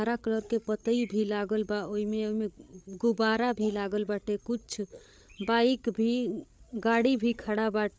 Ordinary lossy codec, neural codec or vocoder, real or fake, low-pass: none; codec, 16 kHz, 8 kbps, FreqCodec, larger model; fake; none